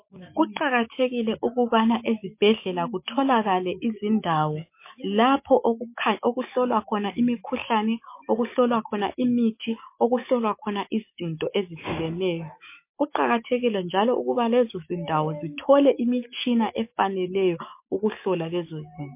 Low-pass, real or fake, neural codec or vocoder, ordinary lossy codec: 3.6 kHz; fake; autoencoder, 48 kHz, 128 numbers a frame, DAC-VAE, trained on Japanese speech; MP3, 24 kbps